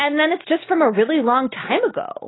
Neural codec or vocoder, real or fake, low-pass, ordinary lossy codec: none; real; 7.2 kHz; AAC, 16 kbps